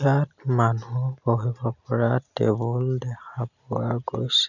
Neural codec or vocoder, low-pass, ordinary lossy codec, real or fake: none; 7.2 kHz; none; real